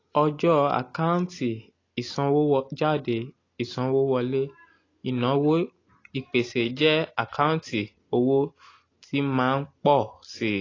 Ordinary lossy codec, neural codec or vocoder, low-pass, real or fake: AAC, 32 kbps; none; 7.2 kHz; real